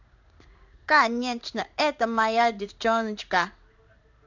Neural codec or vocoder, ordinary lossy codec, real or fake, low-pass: codec, 16 kHz in and 24 kHz out, 1 kbps, XY-Tokenizer; none; fake; 7.2 kHz